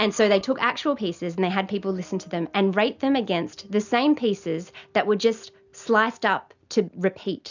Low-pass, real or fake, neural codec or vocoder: 7.2 kHz; real; none